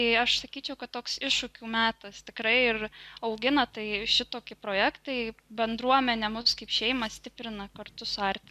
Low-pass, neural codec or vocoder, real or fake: 14.4 kHz; none; real